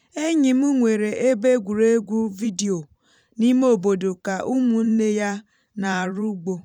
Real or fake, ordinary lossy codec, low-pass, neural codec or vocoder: fake; none; 19.8 kHz; vocoder, 44.1 kHz, 128 mel bands every 512 samples, BigVGAN v2